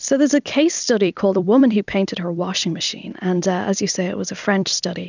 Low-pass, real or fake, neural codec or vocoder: 7.2 kHz; fake; vocoder, 44.1 kHz, 128 mel bands every 256 samples, BigVGAN v2